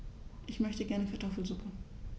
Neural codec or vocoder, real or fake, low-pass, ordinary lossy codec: none; real; none; none